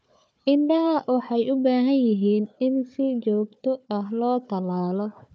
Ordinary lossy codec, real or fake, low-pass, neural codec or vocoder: none; fake; none; codec, 16 kHz, 4 kbps, FunCodec, trained on Chinese and English, 50 frames a second